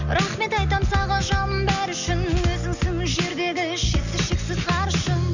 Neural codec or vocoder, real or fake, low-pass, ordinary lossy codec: none; real; 7.2 kHz; none